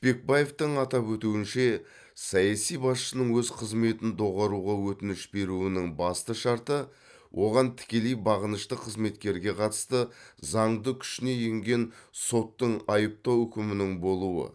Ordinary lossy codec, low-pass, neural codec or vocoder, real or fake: none; none; none; real